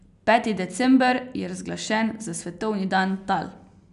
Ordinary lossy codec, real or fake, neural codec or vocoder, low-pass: none; real; none; 10.8 kHz